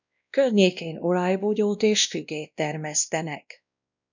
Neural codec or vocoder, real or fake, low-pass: codec, 16 kHz, 1 kbps, X-Codec, WavLM features, trained on Multilingual LibriSpeech; fake; 7.2 kHz